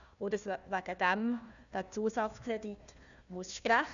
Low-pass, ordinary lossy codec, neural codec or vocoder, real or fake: 7.2 kHz; none; codec, 16 kHz, 1 kbps, FunCodec, trained on Chinese and English, 50 frames a second; fake